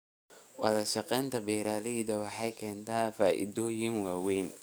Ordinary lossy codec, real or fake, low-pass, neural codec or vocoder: none; fake; none; codec, 44.1 kHz, 7.8 kbps, DAC